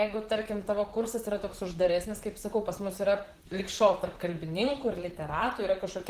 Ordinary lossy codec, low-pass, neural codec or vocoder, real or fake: Opus, 16 kbps; 14.4 kHz; vocoder, 44.1 kHz, 128 mel bands, Pupu-Vocoder; fake